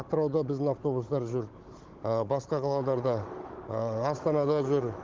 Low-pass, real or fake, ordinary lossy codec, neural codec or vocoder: 7.2 kHz; fake; Opus, 16 kbps; codec, 16 kHz, 16 kbps, FunCodec, trained on Chinese and English, 50 frames a second